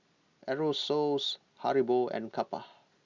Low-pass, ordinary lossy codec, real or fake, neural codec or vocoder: 7.2 kHz; Opus, 64 kbps; real; none